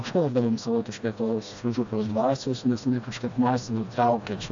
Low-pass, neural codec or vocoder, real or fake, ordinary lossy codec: 7.2 kHz; codec, 16 kHz, 1 kbps, FreqCodec, smaller model; fake; AAC, 64 kbps